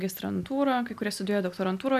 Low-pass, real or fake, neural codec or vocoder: 14.4 kHz; real; none